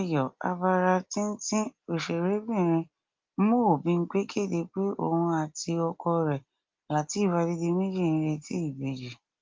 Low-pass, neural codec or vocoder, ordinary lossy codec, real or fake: 7.2 kHz; none; Opus, 24 kbps; real